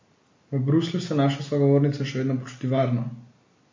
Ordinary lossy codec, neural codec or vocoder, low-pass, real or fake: MP3, 32 kbps; none; 7.2 kHz; real